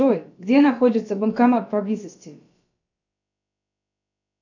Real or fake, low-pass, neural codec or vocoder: fake; 7.2 kHz; codec, 16 kHz, about 1 kbps, DyCAST, with the encoder's durations